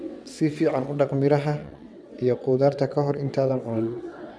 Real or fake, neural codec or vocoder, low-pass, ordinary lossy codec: fake; vocoder, 22.05 kHz, 80 mel bands, WaveNeXt; none; none